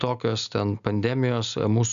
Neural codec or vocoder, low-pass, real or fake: none; 7.2 kHz; real